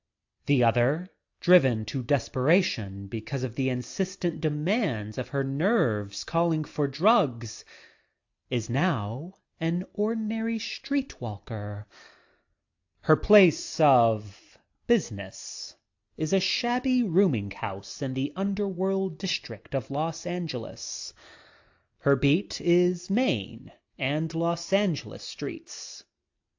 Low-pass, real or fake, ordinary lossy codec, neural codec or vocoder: 7.2 kHz; real; AAC, 48 kbps; none